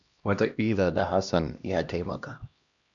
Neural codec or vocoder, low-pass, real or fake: codec, 16 kHz, 1 kbps, X-Codec, HuBERT features, trained on LibriSpeech; 7.2 kHz; fake